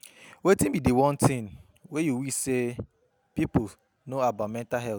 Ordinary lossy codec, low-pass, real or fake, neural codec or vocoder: none; none; real; none